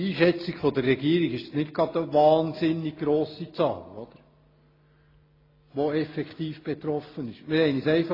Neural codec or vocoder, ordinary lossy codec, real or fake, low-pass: none; AAC, 24 kbps; real; 5.4 kHz